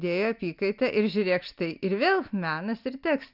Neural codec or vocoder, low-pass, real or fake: none; 5.4 kHz; real